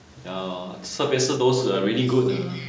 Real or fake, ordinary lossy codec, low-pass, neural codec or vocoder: real; none; none; none